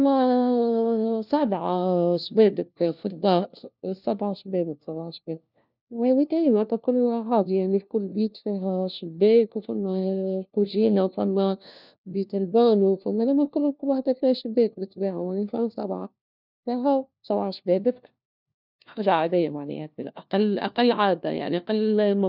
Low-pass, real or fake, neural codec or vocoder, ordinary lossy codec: 5.4 kHz; fake; codec, 16 kHz, 1 kbps, FunCodec, trained on LibriTTS, 50 frames a second; none